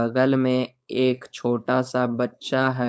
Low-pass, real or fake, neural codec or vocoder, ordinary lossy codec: none; fake; codec, 16 kHz, 4.8 kbps, FACodec; none